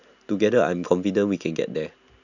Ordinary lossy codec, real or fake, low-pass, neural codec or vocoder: none; real; 7.2 kHz; none